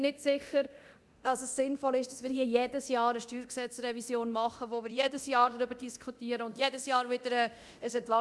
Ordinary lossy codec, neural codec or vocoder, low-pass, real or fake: none; codec, 24 kHz, 0.9 kbps, DualCodec; none; fake